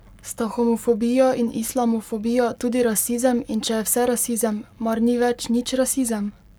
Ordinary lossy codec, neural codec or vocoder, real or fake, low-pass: none; codec, 44.1 kHz, 7.8 kbps, Pupu-Codec; fake; none